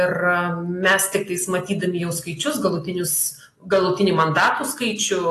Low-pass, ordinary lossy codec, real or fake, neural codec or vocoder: 14.4 kHz; AAC, 48 kbps; real; none